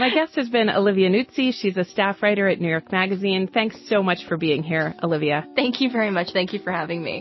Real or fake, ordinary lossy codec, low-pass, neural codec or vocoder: real; MP3, 24 kbps; 7.2 kHz; none